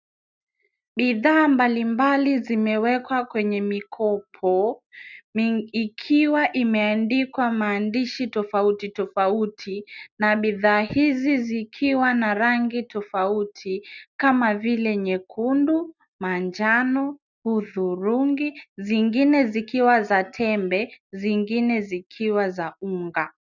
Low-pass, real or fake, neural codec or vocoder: 7.2 kHz; real; none